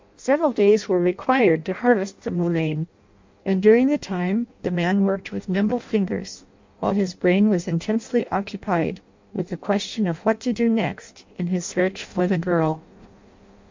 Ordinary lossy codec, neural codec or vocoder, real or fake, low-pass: MP3, 64 kbps; codec, 16 kHz in and 24 kHz out, 0.6 kbps, FireRedTTS-2 codec; fake; 7.2 kHz